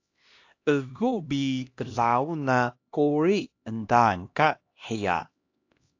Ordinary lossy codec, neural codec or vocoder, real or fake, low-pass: AAC, 48 kbps; codec, 16 kHz, 1 kbps, X-Codec, HuBERT features, trained on LibriSpeech; fake; 7.2 kHz